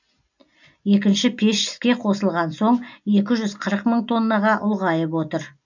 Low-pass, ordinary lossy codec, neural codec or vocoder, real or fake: 7.2 kHz; none; none; real